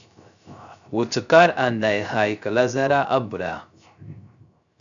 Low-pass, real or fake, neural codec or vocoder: 7.2 kHz; fake; codec, 16 kHz, 0.3 kbps, FocalCodec